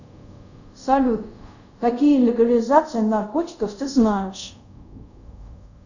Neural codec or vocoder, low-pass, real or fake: codec, 24 kHz, 0.5 kbps, DualCodec; 7.2 kHz; fake